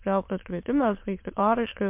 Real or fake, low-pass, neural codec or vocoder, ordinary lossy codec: fake; 3.6 kHz; autoencoder, 22.05 kHz, a latent of 192 numbers a frame, VITS, trained on many speakers; MP3, 32 kbps